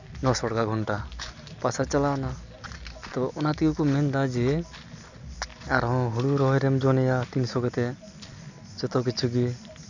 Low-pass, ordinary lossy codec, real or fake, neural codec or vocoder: 7.2 kHz; none; real; none